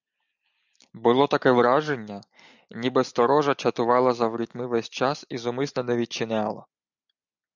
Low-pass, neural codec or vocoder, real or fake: 7.2 kHz; none; real